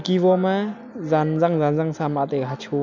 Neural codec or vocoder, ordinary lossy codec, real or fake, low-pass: none; none; real; 7.2 kHz